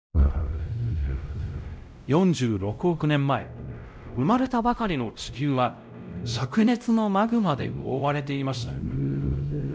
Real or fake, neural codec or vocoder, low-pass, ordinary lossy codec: fake; codec, 16 kHz, 0.5 kbps, X-Codec, WavLM features, trained on Multilingual LibriSpeech; none; none